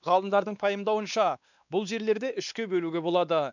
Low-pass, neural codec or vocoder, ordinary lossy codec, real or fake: 7.2 kHz; codec, 16 kHz, 2 kbps, X-Codec, WavLM features, trained on Multilingual LibriSpeech; none; fake